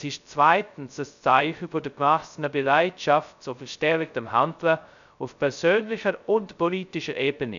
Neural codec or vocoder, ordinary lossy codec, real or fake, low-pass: codec, 16 kHz, 0.2 kbps, FocalCodec; none; fake; 7.2 kHz